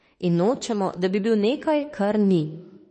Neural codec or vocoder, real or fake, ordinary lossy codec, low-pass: autoencoder, 48 kHz, 32 numbers a frame, DAC-VAE, trained on Japanese speech; fake; MP3, 32 kbps; 10.8 kHz